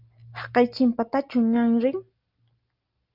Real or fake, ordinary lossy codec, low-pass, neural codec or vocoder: real; Opus, 24 kbps; 5.4 kHz; none